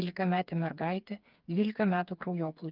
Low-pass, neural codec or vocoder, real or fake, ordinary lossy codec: 5.4 kHz; codec, 16 kHz, 4 kbps, FreqCodec, smaller model; fake; Opus, 24 kbps